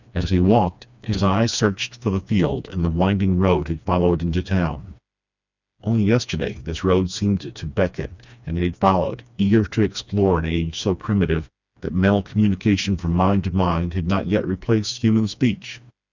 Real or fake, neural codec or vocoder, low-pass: fake; codec, 16 kHz, 2 kbps, FreqCodec, smaller model; 7.2 kHz